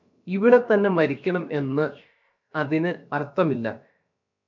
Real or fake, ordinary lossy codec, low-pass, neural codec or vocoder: fake; MP3, 64 kbps; 7.2 kHz; codec, 16 kHz, about 1 kbps, DyCAST, with the encoder's durations